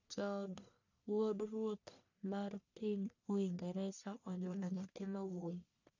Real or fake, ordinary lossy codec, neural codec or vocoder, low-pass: fake; none; codec, 44.1 kHz, 1.7 kbps, Pupu-Codec; 7.2 kHz